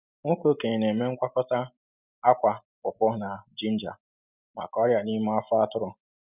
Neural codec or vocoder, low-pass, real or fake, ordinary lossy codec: none; 3.6 kHz; real; none